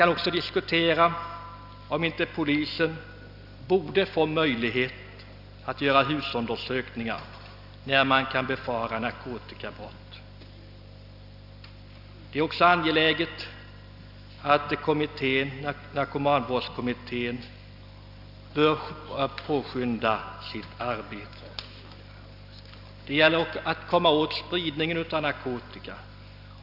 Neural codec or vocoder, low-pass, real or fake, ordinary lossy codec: none; 5.4 kHz; real; none